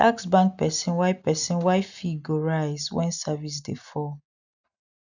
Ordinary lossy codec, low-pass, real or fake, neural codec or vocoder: none; 7.2 kHz; real; none